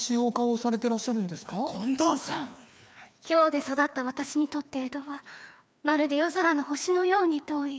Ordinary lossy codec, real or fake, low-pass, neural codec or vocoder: none; fake; none; codec, 16 kHz, 2 kbps, FreqCodec, larger model